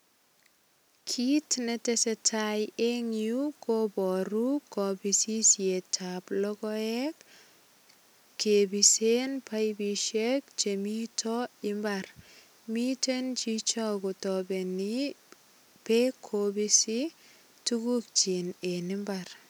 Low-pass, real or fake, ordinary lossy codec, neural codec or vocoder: none; real; none; none